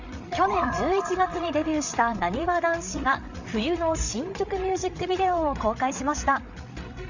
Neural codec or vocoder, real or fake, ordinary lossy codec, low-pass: codec, 16 kHz, 8 kbps, FreqCodec, larger model; fake; none; 7.2 kHz